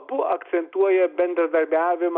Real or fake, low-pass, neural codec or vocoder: real; 5.4 kHz; none